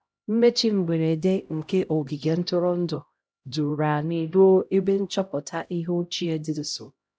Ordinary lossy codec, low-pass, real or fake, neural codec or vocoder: none; none; fake; codec, 16 kHz, 0.5 kbps, X-Codec, HuBERT features, trained on LibriSpeech